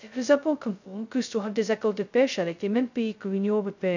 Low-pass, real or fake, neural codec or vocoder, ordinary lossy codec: 7.2 kHz; fake; codec, 16 kHz, 0.2 kbps, FocalCodec; none